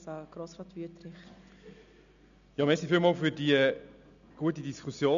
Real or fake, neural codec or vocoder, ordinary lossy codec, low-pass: real; none; none; 7.2 kHz